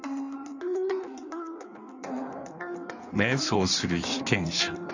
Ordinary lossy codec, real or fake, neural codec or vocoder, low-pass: none; fake; codec, 16 kHz in and 24 kHz out, 1.1 kbps, FireRedTTS-2 codec; 7.2 kHz